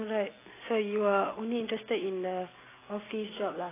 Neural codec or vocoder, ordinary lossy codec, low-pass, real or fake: none; AAC, 16 kbps; 3.6 kHz; real